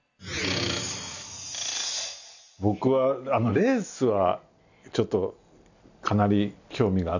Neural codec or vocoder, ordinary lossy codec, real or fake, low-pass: none; none; real; 7.2 kHz